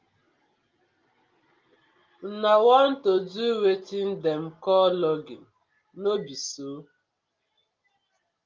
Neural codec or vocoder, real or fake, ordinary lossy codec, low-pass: none; real; Opus, 32 kbps; 7.2 kHz